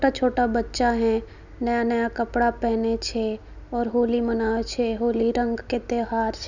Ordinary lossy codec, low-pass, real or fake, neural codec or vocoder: none; 7.2 kHz; real; none